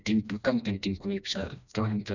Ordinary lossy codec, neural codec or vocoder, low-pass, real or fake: none; codec, 16 kHz, 1 kbps, FreqCodec, smaller model; 7.2 kHz; fake